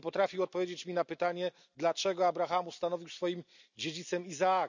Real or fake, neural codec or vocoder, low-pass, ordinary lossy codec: real; none; 7.2 kHz; none